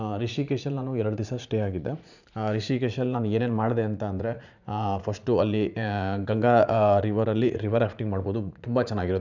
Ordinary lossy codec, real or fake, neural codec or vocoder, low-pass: none; fake; vocoder, 44.1 kHz, 80 mel bands, Vocos; 7.2 kHz